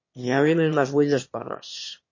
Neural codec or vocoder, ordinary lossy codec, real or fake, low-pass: autoencoder, 22.05 kHz, a latent of 192 numbers a frame, VITS, trained on one speaker; MP3, 32 kbps; fake; 7.2 kHz